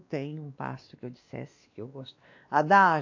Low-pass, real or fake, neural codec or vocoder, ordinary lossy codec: 7.2 kHz; fake; codec, 16 kHz, 2 kbps, X-Codec, WavLM features, trained on Multilingual LibriSpeech; AAC, 48 kbps